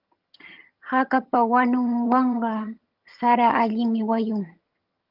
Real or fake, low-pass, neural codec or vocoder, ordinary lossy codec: fake; 5.4 kHz; vocoder, 22.05 kHz, 80 mel bands, HiFi-GAN; Opus, 32 kbps